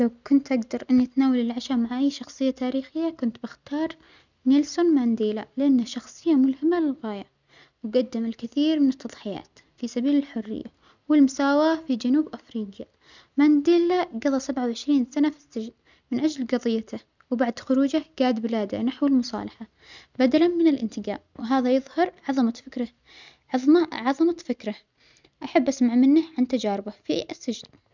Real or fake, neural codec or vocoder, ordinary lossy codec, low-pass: real; none; none; 7.2 kHz